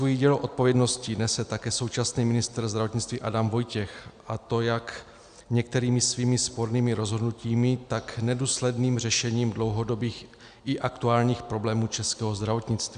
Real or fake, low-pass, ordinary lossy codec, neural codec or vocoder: real; 9.9 kHz; Opus, 64 kbps; none